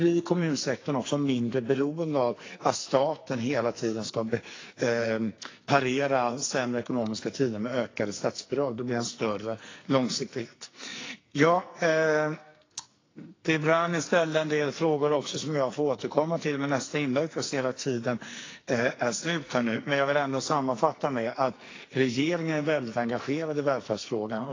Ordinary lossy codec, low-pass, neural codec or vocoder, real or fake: AAC, 32 kbps; 7.2 kHz; codec, 44.1 kHz, 2.6 kbps, SNAC; fake